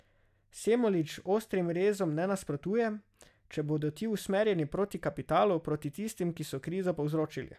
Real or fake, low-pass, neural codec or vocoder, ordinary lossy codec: real; 14.4 kHz; none; none